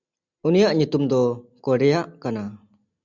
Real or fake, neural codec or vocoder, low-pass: real; none; 7.2 kHz